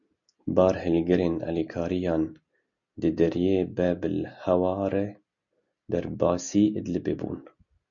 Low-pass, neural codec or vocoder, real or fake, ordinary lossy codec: 7.2 kHz; none; real; MP3, 96 kbps